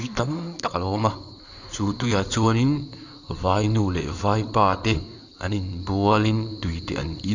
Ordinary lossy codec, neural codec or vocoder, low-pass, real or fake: none; codec, 16 kHz in and 24 kHz out, 2.2 kbps, FireRedTTS-2 codec; 7.2 kHz; fake